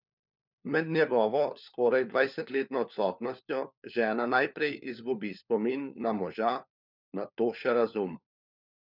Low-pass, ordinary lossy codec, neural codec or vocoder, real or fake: 5.4 kHz; none; codec, 16 kHz, 4 kbps, FunCodec, trained on LibriTTS, 50 frames a second; fake